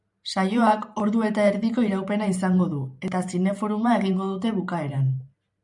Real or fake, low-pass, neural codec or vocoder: fake; 10.8 kHz; vocoder, 44.1 kHz, 128 mel bands every 512 samples, BigVGAN v2